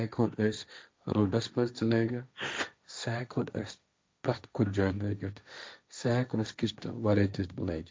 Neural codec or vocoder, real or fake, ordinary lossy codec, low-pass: codec, 16 kHz, 1.1 kbps, Voila-Tokenizer; fake; none; none